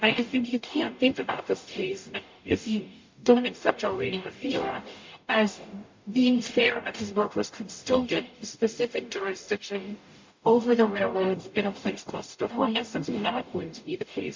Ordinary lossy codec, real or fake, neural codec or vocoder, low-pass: MP3, 48 kbps; fake; codec, 44.1 kHz, 0.9 kbps, DAC; 7.2 kHz